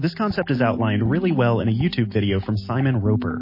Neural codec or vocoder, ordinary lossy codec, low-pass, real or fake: none; MP3, 24 kbps; 5.4 kHz; real